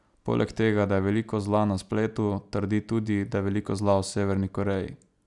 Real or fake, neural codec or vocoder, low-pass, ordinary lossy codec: real; none; 10.8 kHz; none